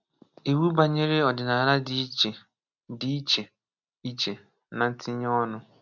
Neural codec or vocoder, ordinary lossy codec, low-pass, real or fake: none; none; 7.2 kHz; real